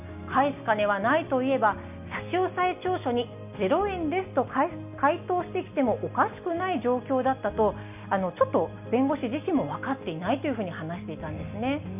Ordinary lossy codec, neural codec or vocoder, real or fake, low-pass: none; none; real; 3.6 kHz